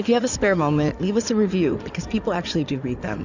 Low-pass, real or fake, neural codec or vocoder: 7.2 kHz; fake; codec, 16 kHz in and 24 kHz out, 2.2 kbps, FireRedTTS-2 codec